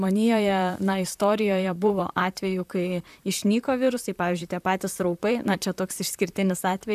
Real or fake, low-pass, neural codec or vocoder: fake; 14.4 kHz; vocoder, 44.1 kHz, 128 mel bands, Pupu-Vocoder